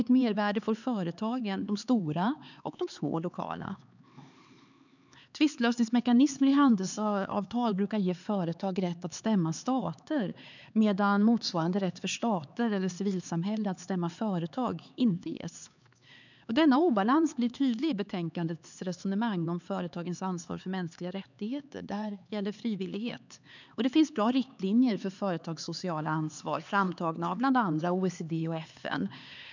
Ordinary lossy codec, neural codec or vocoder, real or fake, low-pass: none; codec, 16 kHz, 4 kbps, X-Codec, HuBERT features, trained on LibriSpeech; fake; 7.2 kHz